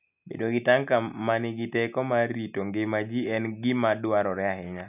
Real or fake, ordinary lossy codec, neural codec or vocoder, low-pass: real; none; none; 3.6 kHz